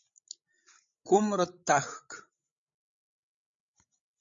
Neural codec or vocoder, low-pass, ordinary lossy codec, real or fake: codec, 16 kHz, 16 kbps, FreqCodec, larger model; 7.2 kHz; MP3, 48 kbps; fake